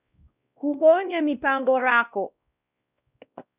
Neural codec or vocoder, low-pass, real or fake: codec, 16 kHz, 1 kbps, X-Codec, WavLM features, trained on Multilingual LibriSpeech; 3.6 kHz; fake